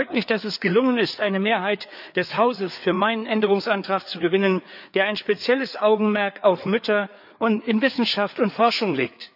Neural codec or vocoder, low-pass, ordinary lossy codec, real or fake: codec, 16 kHz, 4 kbps, FreqCodec, larger model; 5.4 kHz; none; fake